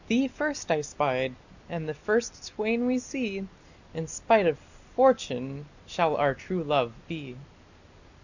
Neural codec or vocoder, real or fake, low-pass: none; real; 7.2 kHz